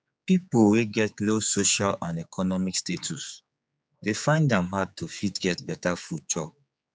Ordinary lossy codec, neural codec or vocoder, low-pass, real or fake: none; codec, 16 kHz, 4 kbps, X-Codec, HuBERT features, trained on general audio; none; fake